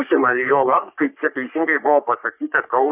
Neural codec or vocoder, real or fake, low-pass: codec, 44.1 kHz, 3.4 kbps, Pupu-Codec; fake; 3.6 kHz